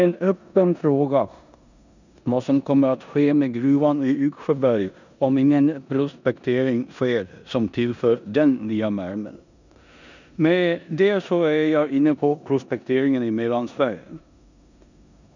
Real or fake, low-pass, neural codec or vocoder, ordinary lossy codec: fake; 7.2 kHz; codec, 16 kHz in and 24 kHz out, 0.9 kbps, LongCat-Audio-Codec, four codebook decoder; none